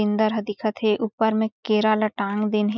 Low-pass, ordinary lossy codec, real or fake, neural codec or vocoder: 7.2 kHz; none; real; none